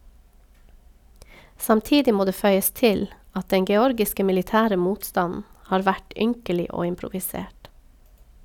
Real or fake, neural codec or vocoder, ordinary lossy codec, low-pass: real; none; none; 19.8 kHz